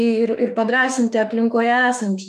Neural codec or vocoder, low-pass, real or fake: autoencoder, 48 kHz, 32 numbers a frame, DAC-VAE, trained on Japanese speech; 14.4 kHz; fake